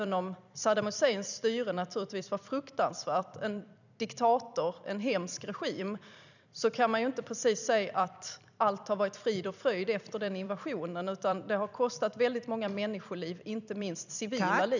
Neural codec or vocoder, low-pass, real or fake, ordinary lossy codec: vocoder, 44.1 kHz, 128 mel bands every 256 samples, BigVGAN v2; 7.2 kHz; fake; none